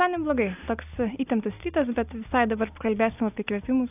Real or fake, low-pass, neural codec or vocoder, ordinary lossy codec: real; 3.6 kHz; none; AAC, 32 kbps